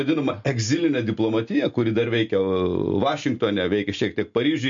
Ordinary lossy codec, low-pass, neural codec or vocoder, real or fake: MP3, 48 kbps; 7.2 kHz; none; real